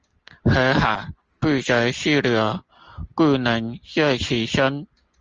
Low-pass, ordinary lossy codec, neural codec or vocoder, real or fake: 7.2 kHz; Opus, 24 kbps; none; real